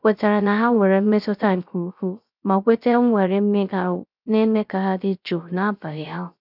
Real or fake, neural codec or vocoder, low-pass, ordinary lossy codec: fake; codec, 16 kHz, 0.3 kbps, FocalCodec; 5.4 kHz; none